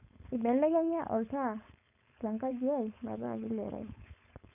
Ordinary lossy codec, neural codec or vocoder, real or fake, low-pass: none; codec, 16 kHz, 4.8 kbps, FACodec; fake; 3.6 kHz